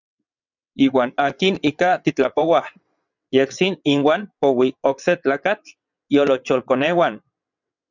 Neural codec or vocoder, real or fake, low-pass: vocoder, 22.05 kHz, 80 mel bands, WaveNeXt; fake; 7.2 kHz